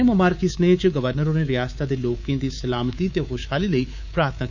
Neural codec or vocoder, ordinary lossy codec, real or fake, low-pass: codec, 24 kHz, 3.1 kbps, DualCodec; none; fake; 7.2 kHz